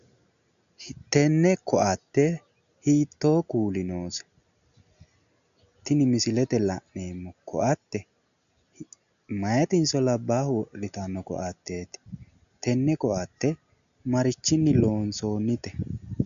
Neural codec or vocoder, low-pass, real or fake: none; 7.2 kHz; real